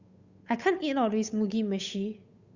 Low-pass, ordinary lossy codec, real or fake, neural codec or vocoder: 7.2 kHz; Opus, 64 kbps; fake; codec, 16 kHz, 8 kbps, FunCodec, trained on Chinese and English, 25 frames a second